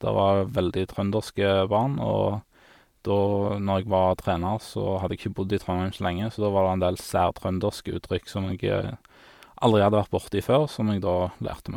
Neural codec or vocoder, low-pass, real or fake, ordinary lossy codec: none; 19.8 kHz; real; MP3, 96 kbps